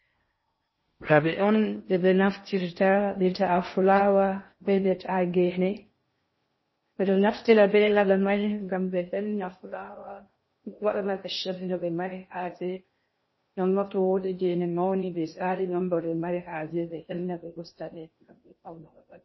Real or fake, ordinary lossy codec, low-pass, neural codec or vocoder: fake; MP3, 24 kbps; 7.2 kHz; codec, 16 kHz in and 24 kHz out, 0.6 kbps, FocalCodec, streaming, 4096 codes